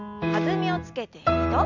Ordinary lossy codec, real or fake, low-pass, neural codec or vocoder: MP3, 64 kbps; real; 7.2 kHz; none